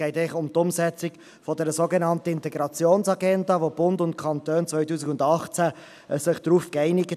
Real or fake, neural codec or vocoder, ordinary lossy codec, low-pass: real; none; none; 14.4 kHz